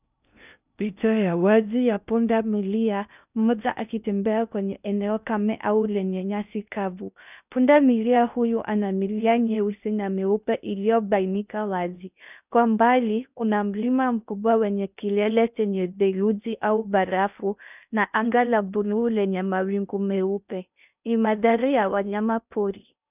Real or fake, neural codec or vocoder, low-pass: fake; codec, 16 kHz in and 24 kHz out, 0.6 kbps, FocalCodec, streaming, 2048 codes; 3.6 kHz